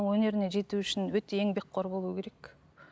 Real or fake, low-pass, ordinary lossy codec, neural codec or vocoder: real; none; none; none